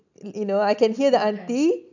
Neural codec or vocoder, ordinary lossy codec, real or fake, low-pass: none; none; real; 7.2 kHz